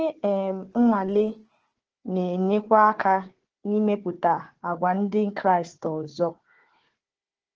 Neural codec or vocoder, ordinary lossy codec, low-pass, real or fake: codec, 16 kHz, 4 kbps, FunCodec, trained on Chinese and English, 50 frames a second; Opus, 16 kbps; 7.2 kHz; fake